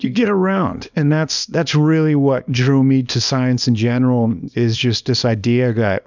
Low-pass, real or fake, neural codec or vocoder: 7.2 kHz; fake; codec, 24 kHz, 0.9 kbps, WavTokenizer, small release